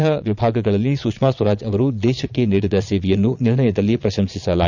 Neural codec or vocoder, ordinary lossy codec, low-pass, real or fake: vocoder, 22.05 kHz, 80 mel bands, Vocos; none; 7.2 kHz; fake